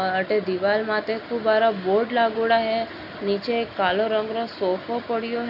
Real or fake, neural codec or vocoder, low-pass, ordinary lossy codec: real; none; 5.4 kHz; none